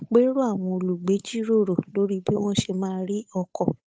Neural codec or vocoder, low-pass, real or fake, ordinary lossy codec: codec, 16 kHz, 8 kbps, FunCodec, trained on Chinese and English, 25 frames a second; none; fake; none